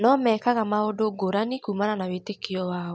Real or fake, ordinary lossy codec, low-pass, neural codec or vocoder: real; none; none; none